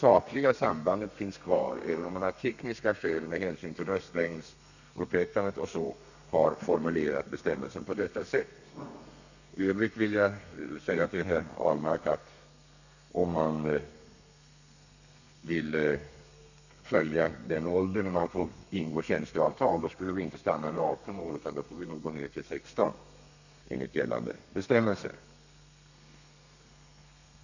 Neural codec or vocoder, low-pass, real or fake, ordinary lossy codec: codec, 32 kHz, 1.9 kbps, SNAC; 7.2 kHz; fake; none